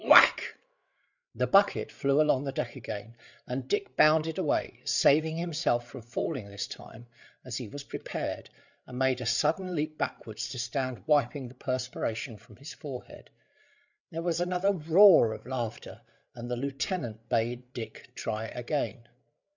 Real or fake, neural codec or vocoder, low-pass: fake; codec, 16 kHz, 8 kbps, FreqCodec, larger model; 7.2 kHz